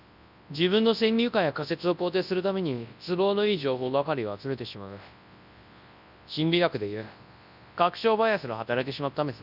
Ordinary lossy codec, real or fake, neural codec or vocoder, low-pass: none; fake; codec, 24 kHz, 0.9 kbps, WavTokenizer, large speech release; 5.4 kHz